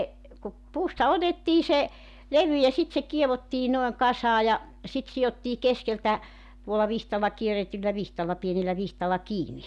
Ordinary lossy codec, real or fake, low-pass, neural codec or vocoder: none; real; none; none